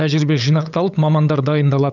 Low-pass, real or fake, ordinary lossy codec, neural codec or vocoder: 7.2 kHz; fake; none; codec, 16 kHz, 8 kbps, FunCodec, trained on LibriTTS, 25 frames a second